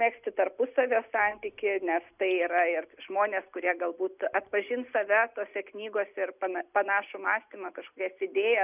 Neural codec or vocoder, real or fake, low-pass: none; real; 3.6 kHz